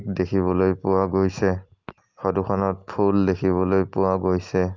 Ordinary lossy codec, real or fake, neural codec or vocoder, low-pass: Opus, 24 kbps; real; none; 7.2 kHz